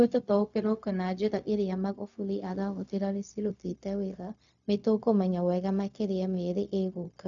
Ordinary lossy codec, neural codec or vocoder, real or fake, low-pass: none; codec, 16 kHz, 0.4 kbps, LongCat-Audio-Codec; fake; 7.2 kHz